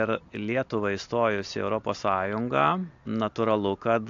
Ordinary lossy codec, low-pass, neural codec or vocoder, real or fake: AAC, 64 kbps; 7.2 kHz; none; real